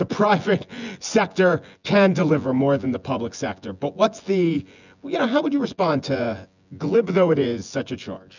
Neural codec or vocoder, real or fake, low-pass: vocoder, 24 kHz, 100 mel bands, Vocos; fake; 7.2 kHz